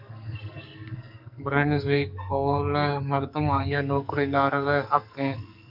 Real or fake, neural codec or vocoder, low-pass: fake; codec, 44.1 kHz, 2.6 kbps, SNAC; 5.4 kHz